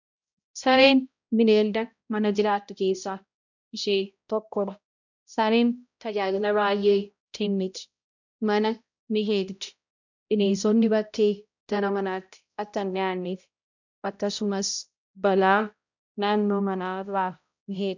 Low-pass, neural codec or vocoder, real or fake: 7.2 kHz; codec, 16 kHz, 0.5 kbps, X-Codec, HuBERT features, trained on balanced general audio; fake